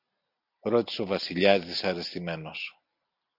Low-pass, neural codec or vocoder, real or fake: 5.4 kHz; none; real